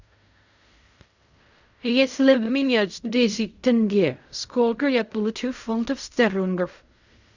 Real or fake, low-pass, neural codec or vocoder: fake; 7.2 kHz; codec, 16 kHz in and 24 kHz out, 0.4 kbps, LongCat-Audio-Codec, fine tuned four codebook decoder